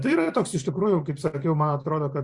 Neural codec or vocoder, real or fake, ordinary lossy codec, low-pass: vocoder, 44.1 kHz, 128 mel bands every 512 samples, BigVGAN v2; fake; Opus, 64 kbps; 10.8 kHz